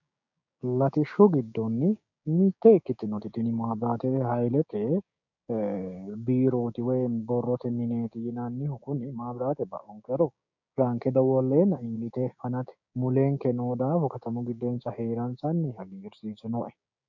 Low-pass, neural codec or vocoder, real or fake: 7.2 kHz; codec, 16 kHz, 6 kbps, DAC; fake